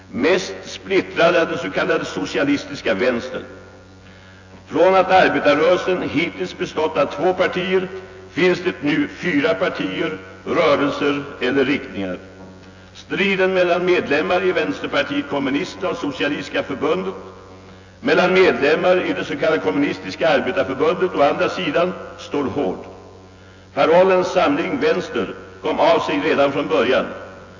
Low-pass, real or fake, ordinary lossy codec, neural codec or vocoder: 7.2 kHz; fake; AAC, 48 kbps; vocoder, 24 kHz, 100 mel bands, Vocos